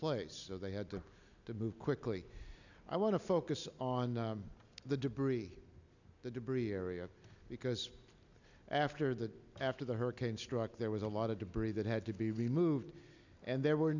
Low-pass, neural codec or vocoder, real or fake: 7.2 kHz; none; real